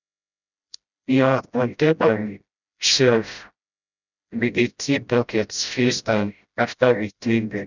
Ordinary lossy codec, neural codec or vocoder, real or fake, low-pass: none; codec, 16 kHz, 0.5 kbps, FreqCodec, smaller model; fake; 7.2 kHz